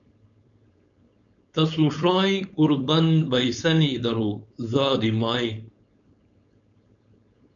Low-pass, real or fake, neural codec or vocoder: 7.2 kHz; fake; codec, 16 kHz, 4.8 kbps, FACodec